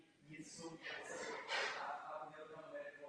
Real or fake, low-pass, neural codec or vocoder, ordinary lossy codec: real; 9.9 kHz; none; Opus, 32 kbps